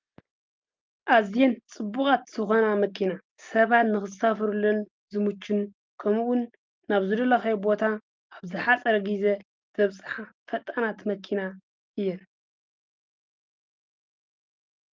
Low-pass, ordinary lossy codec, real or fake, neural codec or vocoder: 7.2 kHz; Opus, 24 kbps; real; none